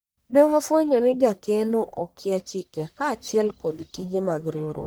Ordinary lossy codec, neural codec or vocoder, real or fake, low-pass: none; codec, 44.1 kHz, 1.7 kbps, Pupu-Codec; fake; none